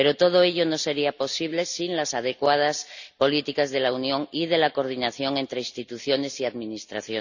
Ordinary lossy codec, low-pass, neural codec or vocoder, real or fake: none; 7.2 kHz; none; real